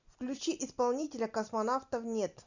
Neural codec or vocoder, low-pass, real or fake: none; 7.2 kHz; real